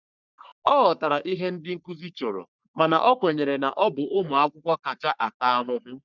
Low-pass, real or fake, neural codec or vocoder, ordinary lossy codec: 7.2 kHz; fake; codec, 44.1 kHz, 3.4 kbps, Pupu-Codec; none